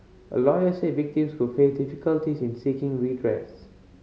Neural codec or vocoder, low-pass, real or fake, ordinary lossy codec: none; none; real; none